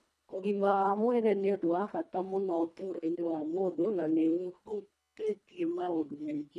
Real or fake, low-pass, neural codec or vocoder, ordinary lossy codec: fake; none; codec, 24 kHz, 1.5 kbps, HILCodec; none